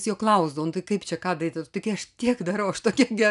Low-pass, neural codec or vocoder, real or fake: 10.8 kHz; none; real